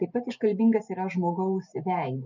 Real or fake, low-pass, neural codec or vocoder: real; 7.2 kHz; none